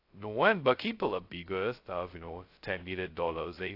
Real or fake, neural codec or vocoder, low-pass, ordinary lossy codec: fake; codec, 16 kHz, 0.2 kbps, FocalCodec; 5.4 kHz; AAC, 32 kbps